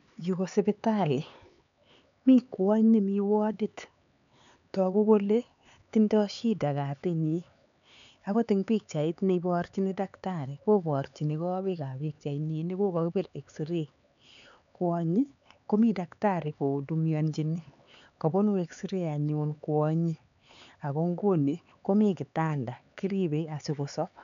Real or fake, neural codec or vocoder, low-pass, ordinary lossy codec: fake; codec, 16 kHz, 4 kbps, X-Codec, HuBERT features, trained on LibriSpeech; 7.2 kHz; none